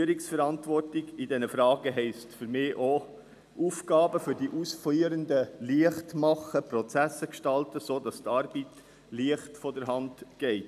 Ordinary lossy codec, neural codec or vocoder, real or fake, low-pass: none; none; real; 14.4 kHz